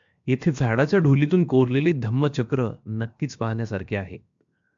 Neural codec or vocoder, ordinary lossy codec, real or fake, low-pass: codec, 16 kHz, 0.7 kbps, FocalCodec; MP3, 64 kbps; fake; 7.2 kHz